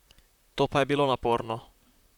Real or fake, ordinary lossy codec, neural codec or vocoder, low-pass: fake; none; vocoder, 44.1 kHz, 128 mel bands, Pupu-Vocoder; 19.8 kHz